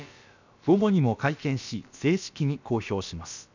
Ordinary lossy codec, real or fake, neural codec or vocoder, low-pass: none; fake; codec, 16 kHz, about 1 kbps, DyCAST, with the encoder's durations; 7.2 kHz